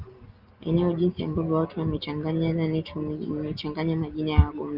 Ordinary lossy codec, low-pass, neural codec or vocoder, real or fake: Opus, 32 kbps; 5.4 kHz; none; real